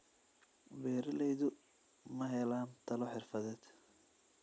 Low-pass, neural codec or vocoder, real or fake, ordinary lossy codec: none; none; real; none